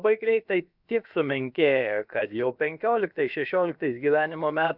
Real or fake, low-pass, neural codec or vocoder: fake; 5.4 kHz; codec, 16 kHz, about 1 kbps, DyCAST, with the encoder's durations